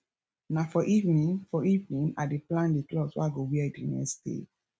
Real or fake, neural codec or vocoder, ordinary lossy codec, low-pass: real; none; none; none